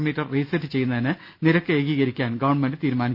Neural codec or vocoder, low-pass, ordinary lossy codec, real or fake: none; 5.4 kHz; none; real